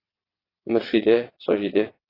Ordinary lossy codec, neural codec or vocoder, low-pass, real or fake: AAC, 24 kbps; vocoder, 22.05 kHz, 80 mel bands, WaveNeXt; 5.4 kHz; fake